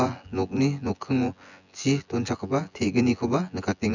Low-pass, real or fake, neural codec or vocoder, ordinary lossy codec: 7.2 kHz; fake; vocoder, 24 kHz, 100 mel bands, Vocos; none